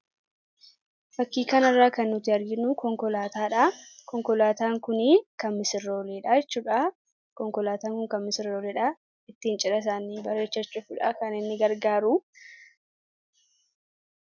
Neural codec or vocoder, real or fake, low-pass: none; real; 7.2 kHz